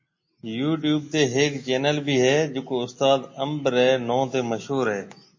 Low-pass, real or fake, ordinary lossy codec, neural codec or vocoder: 7.2 kHz; real; MP3, 32 kbps; none